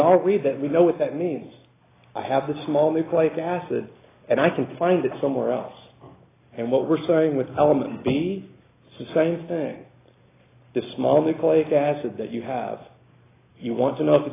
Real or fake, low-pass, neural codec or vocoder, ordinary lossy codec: real; 3.6 kHz; none; AAC, 16 kbps